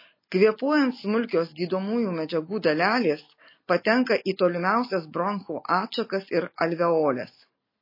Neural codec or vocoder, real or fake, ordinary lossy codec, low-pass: none; real; MP3, 24 kbps; 5.4 kHz